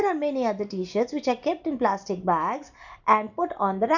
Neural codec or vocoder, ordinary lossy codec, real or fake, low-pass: none; none; real; 7.2 kHz